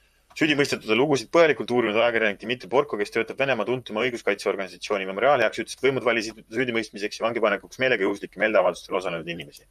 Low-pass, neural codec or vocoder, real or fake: 14.4 kHz; vocoder, 44.1 kHz, 128 mel bands, Pupu-Vocoder; fake